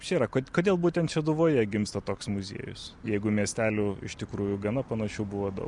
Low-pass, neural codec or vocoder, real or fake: 10.8 kHz; none; real